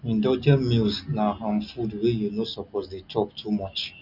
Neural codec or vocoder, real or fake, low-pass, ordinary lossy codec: none; real; 5.4 kHz; none